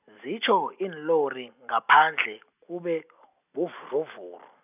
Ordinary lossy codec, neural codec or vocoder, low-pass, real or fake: none; none; 3.6 kHz; real